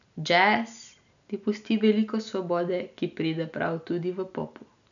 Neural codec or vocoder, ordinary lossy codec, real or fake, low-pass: none; none; real; 7.2 kHz